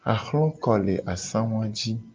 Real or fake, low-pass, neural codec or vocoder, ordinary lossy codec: real; 7.2 kHz; none; Opus, 24 kbps